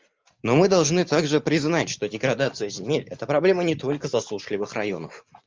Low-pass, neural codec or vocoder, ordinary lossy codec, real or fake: 7.2 kHz; none; Opus, 24 kbps; real